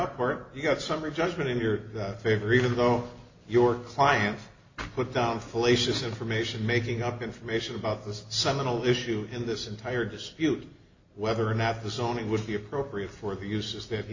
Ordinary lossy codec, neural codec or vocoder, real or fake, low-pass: MP3, 48 kbps; none; real; 7.2 kHz